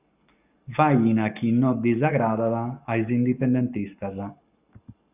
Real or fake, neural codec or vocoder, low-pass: fake; codec, 44.1 kHz, 7.8 kbps, Pupu-Codec; 3.6 kHz